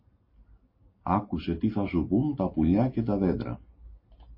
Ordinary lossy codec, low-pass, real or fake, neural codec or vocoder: MP3, 24 kbps; 5.4 kHz; real; none